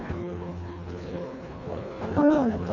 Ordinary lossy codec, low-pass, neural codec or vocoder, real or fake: none; 7.2 kHz; codec, 24 kHz, 1.5 kbps, HILCodec; fake